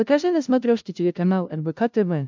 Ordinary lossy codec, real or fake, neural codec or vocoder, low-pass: MP3, 64 kbps; fake; codec, 16 kHz, 0.5 kbps, FunCodec, trained on LibriTTS, 25 frames a second; 7.2 kHz